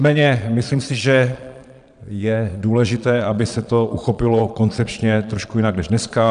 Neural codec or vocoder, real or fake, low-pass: vocoder, 22.05 kHz, 80 mel bands, Vocos; fake; 9.9 kHz